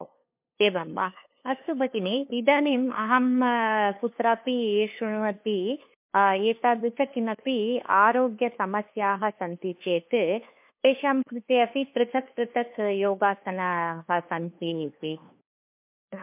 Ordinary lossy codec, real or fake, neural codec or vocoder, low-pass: MP3, 32 kbps; fake; codec, 16 kHz, 2 kbps, FunCodec, trained on LibriTTS, 25 frames a second; 3.6 kHz